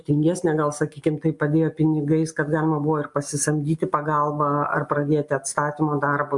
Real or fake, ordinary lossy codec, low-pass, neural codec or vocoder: real; MP3, 64 kbps; 10.8 kHz; none